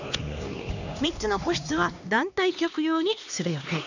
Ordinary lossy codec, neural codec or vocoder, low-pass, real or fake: none; codec, 16 kHz, 2 kbps, X-Codec, HuBERT features, trained on LibriSpeech; 7.2 kHz; fake